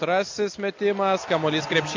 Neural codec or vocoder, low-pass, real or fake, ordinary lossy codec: none; 7.2 kHz; real; MP3, 48 kbps